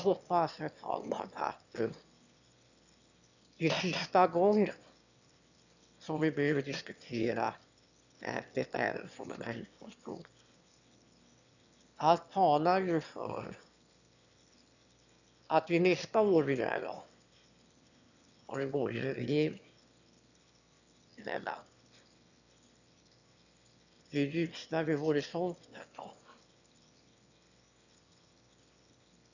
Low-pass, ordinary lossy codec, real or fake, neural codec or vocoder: 7.2 kHz; none; fake; autoencoder, 22.05 kHz, a latent of 192 numbers a frame, VITS, trained on one speaker